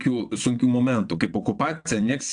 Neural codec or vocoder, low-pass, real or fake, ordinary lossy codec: vocoder, 22.05 kHz, 80 mel bands, WaveNeXt; 9.9 kHz; fake; AAC, 64 kbps